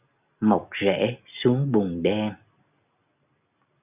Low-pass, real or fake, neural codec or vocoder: 3.6 kHz; real; none